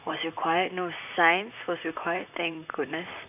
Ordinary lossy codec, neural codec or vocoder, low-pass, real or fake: none; vocoder, 44.1 kHz, 128 mel bands, Pupu-Vocoder; 3.6 kHz; fake